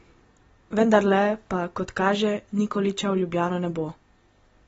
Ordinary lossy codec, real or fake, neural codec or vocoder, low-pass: AAC, 24 kbps; real; none; 19.8 kHz